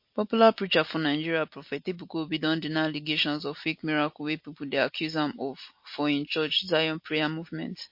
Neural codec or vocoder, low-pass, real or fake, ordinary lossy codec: none; 5.4 kHz; real; MP3, 32 kbps